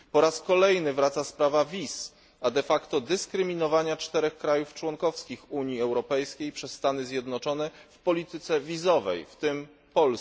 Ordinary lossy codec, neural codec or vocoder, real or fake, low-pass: none; none; real; none